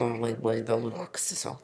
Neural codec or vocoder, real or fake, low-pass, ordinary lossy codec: autoencoder, 22.05 kHz, a latent of 192 numbers a frame, VITS, trained on one speaker; fake; none; none